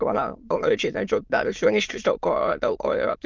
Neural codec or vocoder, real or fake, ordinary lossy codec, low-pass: autoencoder, 22.05 kHz, a latent of 192 numbers a frame, VITS, trained on many speakers; fake; Opus, 24 kbps; 7.2 kHz